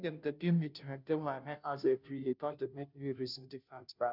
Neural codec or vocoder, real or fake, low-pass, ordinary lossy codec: codec, 16 kHz, 0.5 kbps, FunCodec, trained on Chinese and English, 25 frames a second; fake; 5.4 kHz; none